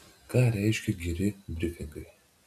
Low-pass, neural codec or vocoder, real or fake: 14.4 kHz; none; real